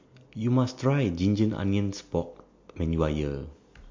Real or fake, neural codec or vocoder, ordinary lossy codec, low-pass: real; none; MP3, 48 kbps; 7.2 kHz